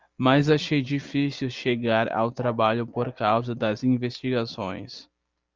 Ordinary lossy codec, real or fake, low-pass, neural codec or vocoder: Opus, 24 kbps; real; 7.2 kHz; none